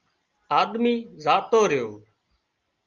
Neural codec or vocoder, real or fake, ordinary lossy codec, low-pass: none; real; Opus, 24 kbps; 7.2 kHz